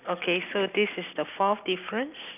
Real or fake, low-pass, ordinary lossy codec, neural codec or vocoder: real; 3.6 kHz; none; none